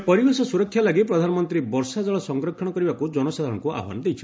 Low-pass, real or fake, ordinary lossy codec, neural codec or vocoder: none; real; none; none